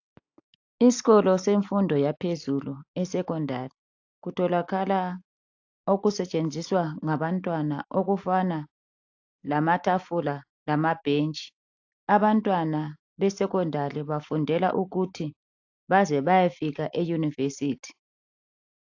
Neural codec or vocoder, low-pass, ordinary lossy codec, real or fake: none; 7.2 kHz; AAC, 48 kbps; real